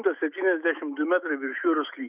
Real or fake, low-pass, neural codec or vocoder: fake; 3.6 kHz; autoencoder, 48 kHz, 128 numbers a frame, DAC-VAE, trained on Japanese speech